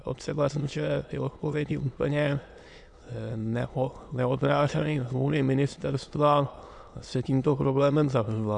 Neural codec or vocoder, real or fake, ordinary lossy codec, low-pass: autoencoder, 22.05 kHz, a latent of 192 numbers a frame, VITS, trained on many speakers; fake; MP3, 64 kbps; 9.9 kHz